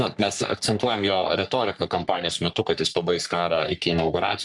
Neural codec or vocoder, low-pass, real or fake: codec, 44.1 kHz, 3.4 kbps, Pupu-Codec; 10.8 kHz; fake